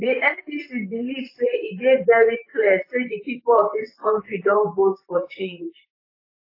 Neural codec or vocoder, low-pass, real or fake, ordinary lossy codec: vocoder, 44.1 kHz, 128 mel bands, Pupu-Vocoder; 5.4 kHz; fake; AAC, 32 kbps